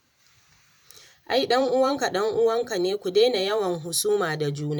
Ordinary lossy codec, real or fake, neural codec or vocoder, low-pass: none; fake; vocoder, 44.1 kHz, 128 mel bands every 512 samples, BigVGAN v2; 19.8 kHz